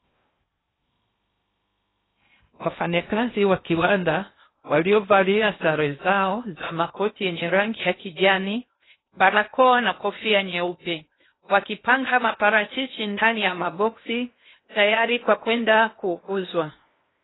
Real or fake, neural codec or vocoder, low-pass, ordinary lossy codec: fake; codec, 16 kHz in and 24 kHz out, 0.6 kbps, FocalCodec, streaming, 2048 codes; 7.2 kHz; AAC, 16 kbps